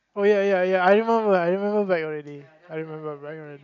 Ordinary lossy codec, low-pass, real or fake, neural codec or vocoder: none; 7.2 kHz; real; none